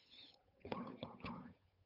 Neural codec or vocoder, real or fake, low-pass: codec, 16 kHz, 8 kbps, FunCodec, trained on Chinese and English, 25 frames a second; fake; 5.4 kHz